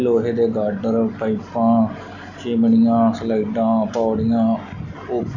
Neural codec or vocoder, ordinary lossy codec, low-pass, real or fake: none; none; 7.2 kHz; real